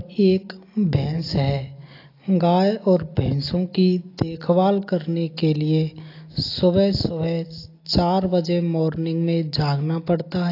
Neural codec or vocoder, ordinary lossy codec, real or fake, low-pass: none; AAC, 32 kbps; real; 5.4 kHz